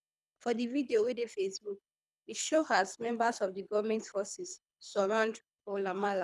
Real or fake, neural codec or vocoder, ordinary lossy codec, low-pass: fake; codec, 24 kHz, 3 kbps, HILCodec; none; none